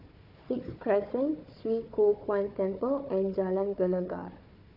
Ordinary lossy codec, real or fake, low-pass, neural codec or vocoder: none; fake; 5.4 kHz; codec, 16 kHz, 4 kbps, FunCodec, trained on Chinese and English, 50 frames a second